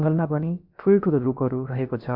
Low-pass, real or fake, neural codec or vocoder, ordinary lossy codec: 5.4 kHz; fake; codec, 16 kHz, about 1 kbps, DyCAST, with the encoder's durations; MP3, 48 kbps